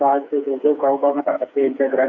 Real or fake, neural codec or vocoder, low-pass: fake; codec, 16 kHz, 4 kbps, FreqCodec, smaller model; 7.2 kHz